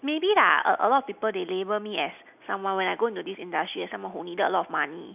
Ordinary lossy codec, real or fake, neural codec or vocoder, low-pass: none; real; none; 3.6 kHz